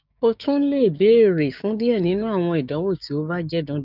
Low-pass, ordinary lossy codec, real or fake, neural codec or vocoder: 5.4 kHz; none; fake; codec, 16 kHz, 6 kbps, DAC